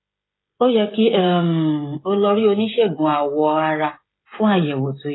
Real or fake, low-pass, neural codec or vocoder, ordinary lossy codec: fake; 7.2 kHz; codec, 16 kHz, 16 kbps, FreqCodec, smaller model; AAC, 16 kbps